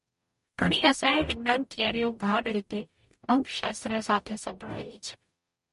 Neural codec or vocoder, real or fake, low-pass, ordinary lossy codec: codec, 44.1 kHz, 0.9 kbps, DAC; fake; 14.4 kHz; MP3, 48 kbps